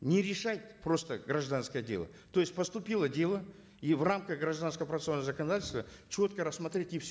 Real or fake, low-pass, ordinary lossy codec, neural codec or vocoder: real; none; none; none